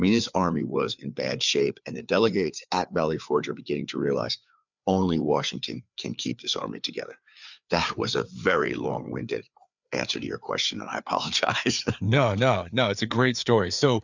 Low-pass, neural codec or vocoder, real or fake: 7.2 kHz; codec, 16 kHz, 4 kbps, FreqCodec, larger model; fake